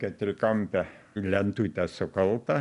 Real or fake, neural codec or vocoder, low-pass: real; none; 10.8 kHz